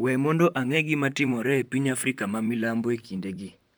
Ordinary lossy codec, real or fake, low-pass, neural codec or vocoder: none; fake; none; vocoder, 44.1 kHz, 128 mel bands, Pupu-Vocoder